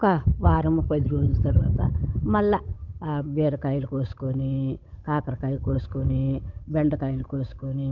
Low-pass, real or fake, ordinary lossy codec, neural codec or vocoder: 7.2 kHz; fake; none; codec, 16 kHz, 8 kbps, FunCodec, trained on Chinese and English, 25 frames a second